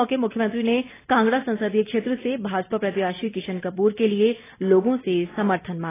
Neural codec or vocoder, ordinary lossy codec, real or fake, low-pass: none; AAC, 16 kbps; real; 3.6 kHz